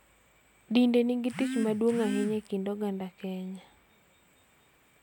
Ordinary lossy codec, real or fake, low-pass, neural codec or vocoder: none; real; 19.8 kHz; none